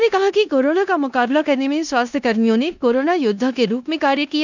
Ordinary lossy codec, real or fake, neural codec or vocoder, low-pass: none; fake; codec, 16 kHz in and 24 kHz out, 0.9 kbps, LongCat-Audio-Codec, four codebook decoder; 7.2 kHz